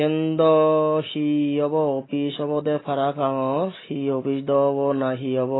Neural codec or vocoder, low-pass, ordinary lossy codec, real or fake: none; 7.2 kHz; AAC, 16 kbps; real